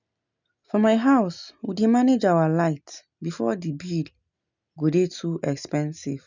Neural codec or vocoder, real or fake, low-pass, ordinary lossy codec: none; real; 7.2 kHz; none